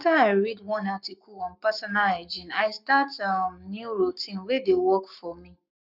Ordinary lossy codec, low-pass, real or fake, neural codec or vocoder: none; 5.4 kHz; fake; codec, 44.1 kHz, 7.8 kbps, Pupu-Codec